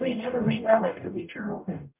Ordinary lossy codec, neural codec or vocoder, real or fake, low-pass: MP3, 32 kbps; codec, 44.1 kHz, 0.9 kbps, DAC; fake; 3.6 kHz